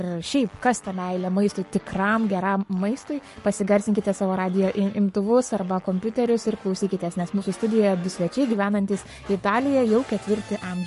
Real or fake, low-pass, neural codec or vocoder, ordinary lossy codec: fake; 14.4 kHz; codec, 44.1 kHz, 7.8 kbps, Pupu-Codec; MP3, 48 kbps